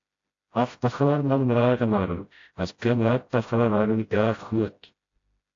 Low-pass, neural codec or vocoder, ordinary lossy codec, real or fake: 7.2 kHz; codec, 16 kHz, 0.5 kbps, FreqCodec, smaller model; AAC, 48 kbps; fake